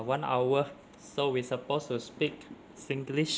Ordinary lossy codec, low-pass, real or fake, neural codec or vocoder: none; none; real; none